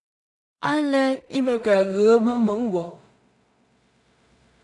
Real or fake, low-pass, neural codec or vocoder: fake; 10.8 kHz; codec, 16 kHz in and 24 kHz out, 0.4 kbps, LongCat-Audio-Codec, two codebook decoder